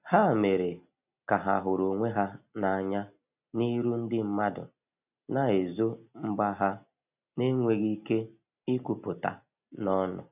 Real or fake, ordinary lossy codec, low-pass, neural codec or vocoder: real; none; 3.6 kHz; none